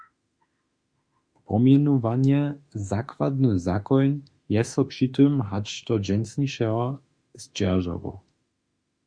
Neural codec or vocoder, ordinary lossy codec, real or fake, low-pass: autoencoder, 48 kHz, 32 numbers a frame, DAC-VAE, trained on Japanese speech; Opus, 64 kbps; fake; 9.9 kHz